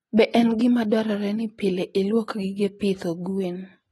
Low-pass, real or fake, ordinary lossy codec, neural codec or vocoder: 19.8 kHz; real; AAC, 32 kbps; none